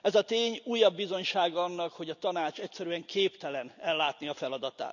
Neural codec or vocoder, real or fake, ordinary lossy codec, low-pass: none; real; none; 7.2 kHz